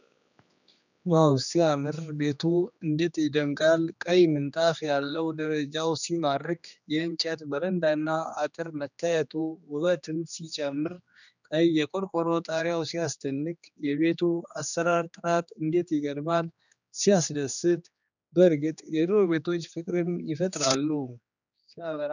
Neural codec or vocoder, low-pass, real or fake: codec, 16 kHz, 2 kbps, X-Codec, HuBERT features, trained on general audio; 7.2 kHz; fake